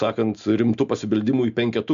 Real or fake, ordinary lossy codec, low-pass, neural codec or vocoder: real; MP3, 64 kbps; 7.2 kHz; none